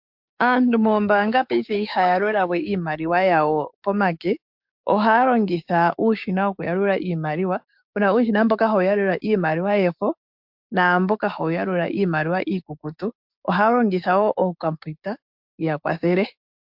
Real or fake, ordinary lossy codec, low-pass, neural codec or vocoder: fake; MP3, 48 kbps; 5.4 kHz; codec, 16 kHz, 6 kbps, DAC